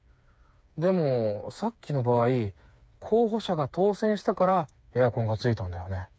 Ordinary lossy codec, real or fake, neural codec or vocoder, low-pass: none; fake; codec, 16 kHz, 4 kbps, FreqCodec, smaller model; none